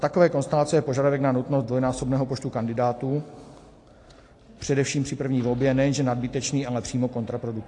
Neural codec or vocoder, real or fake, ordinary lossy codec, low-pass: none; real; AAC, 48 kbps; 10.8 kHz